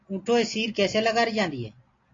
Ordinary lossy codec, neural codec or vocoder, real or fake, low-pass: AAC, 32 kbps; none; real; 7.2 kHz